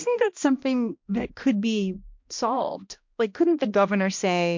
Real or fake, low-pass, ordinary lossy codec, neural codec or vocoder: fake; 7.2 kHz; MP3, 48 kbps; codec, 16 kHz, 1 kbps, X-Codec, HuBERT features, trained on balanced general audio